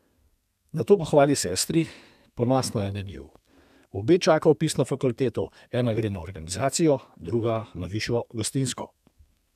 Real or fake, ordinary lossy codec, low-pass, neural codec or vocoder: fake; none; 14.4 kHz; codec, 32 kHz, 1.9 kbps, SNAC